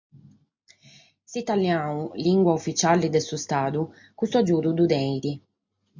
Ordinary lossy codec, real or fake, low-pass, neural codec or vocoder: MP3, 48 kbps; real; 7.2 kHz; none